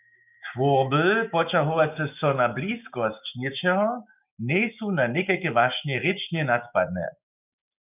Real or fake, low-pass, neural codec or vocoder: fake; 3.6 kHz; codec, 16 kHz, 6 kbps, DAC